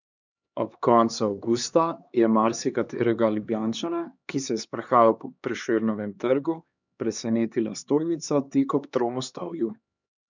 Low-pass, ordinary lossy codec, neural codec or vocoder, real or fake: 7.2 kHz; none; codec, 16 kHz, 2 kbps, X-Codec, HuBERT features, trained on LibriSpeech; fake